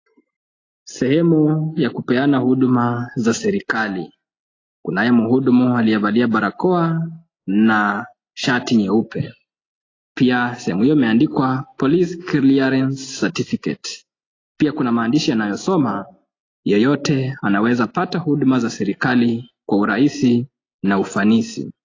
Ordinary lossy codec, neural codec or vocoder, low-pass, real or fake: AAC, 32 kbps; none; 7.2 kHz; real